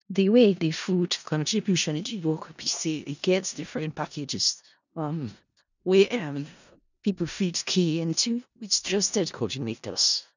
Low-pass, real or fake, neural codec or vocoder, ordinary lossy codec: 7.2 kHz; fake; codec, 16 kHz in and 24 kHz out, 0.4 kbps, LongCat-Audio-Codec, four codebook decoder; none